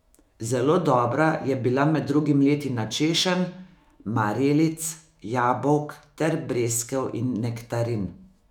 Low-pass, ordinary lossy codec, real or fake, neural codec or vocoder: 19.8 kHz; none; fake; autoencoder, 48 kHz, 128 numbers a frame, DAC-VAE, trained on Japanese speech